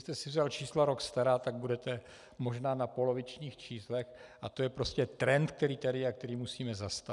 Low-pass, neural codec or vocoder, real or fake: 10.8 kHz; none; real